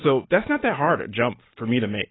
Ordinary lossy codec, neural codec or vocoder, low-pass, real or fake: AAC, 16 kbps; none; 7.2 kHz; real